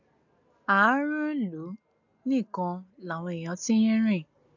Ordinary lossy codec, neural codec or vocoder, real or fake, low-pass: none; none; real; 7.2 kHz